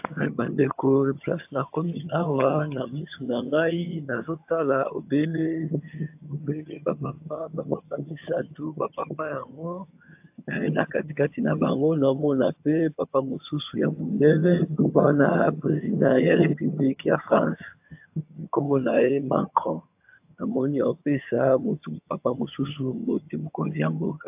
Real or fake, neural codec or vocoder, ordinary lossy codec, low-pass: fake; vocoder, 22.05 kHz, 80 mel bands, HiFi-GAN; AAC, 32 kbps; 3.6 kHz